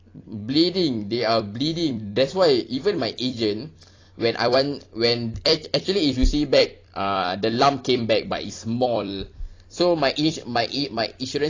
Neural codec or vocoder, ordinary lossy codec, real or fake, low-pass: vocoder, 44.1 kHz, 128 mel bands every 256 samples, BigVGAN v2; AAC, 32 kbps; fake; 7.2 kHz